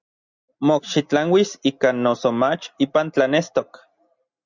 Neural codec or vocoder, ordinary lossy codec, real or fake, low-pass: none; Opus, 64 kbps; real; 7.2 kHz